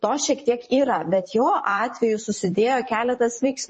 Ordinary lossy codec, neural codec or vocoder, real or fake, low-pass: MP3, 32 kbps; vocoder, 44.1 kHz, 128 mel bands, Pupu-Vocoder; fake; 10.8 kHz